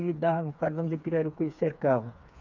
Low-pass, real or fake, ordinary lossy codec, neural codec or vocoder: 7.2 kHz; fake; none; codec, 16 kHz, 4 kbps, FreqCodec, smaller model